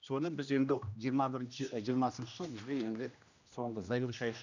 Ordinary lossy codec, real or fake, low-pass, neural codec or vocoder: none; fake; 7.2 kHz; codec, 16 kHz, 1 kbps, X-Codec, HuBERT features, trained on general audio